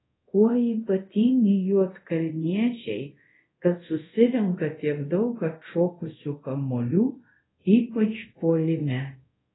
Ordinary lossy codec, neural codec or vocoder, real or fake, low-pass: AAC, 16 kbps; codec, 24 kHz, 0.5 kbps, DualCodec; fake; 7.2 kHz